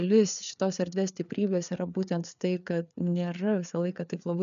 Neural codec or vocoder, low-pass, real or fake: codec, 16 kHz, 4 kbps, FreqCodec, larger model; 7.2 kHz; fake